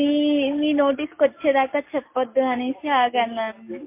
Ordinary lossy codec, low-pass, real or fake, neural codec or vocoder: MP3, 24 kbps; 3.6 kHz; real; none